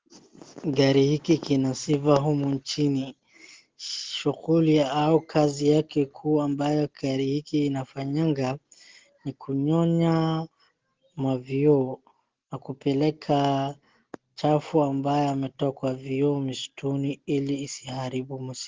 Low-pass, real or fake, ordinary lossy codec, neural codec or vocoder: 7.2 kHz; real; Opus, 16 kbps; none